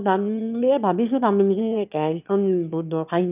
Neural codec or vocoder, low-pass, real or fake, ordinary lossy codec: autoencoder, 22.05 kHz, a latent of 192 numbers a frame, VITS, trained on one speaker; 3.6 kHz; fake; none